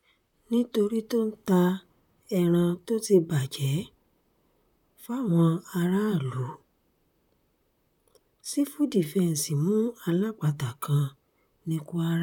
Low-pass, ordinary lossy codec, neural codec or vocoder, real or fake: 19.8 kHz; none; vocoder, 44.1 kHz, 128 mel bands, Pupu-Vocoder; fake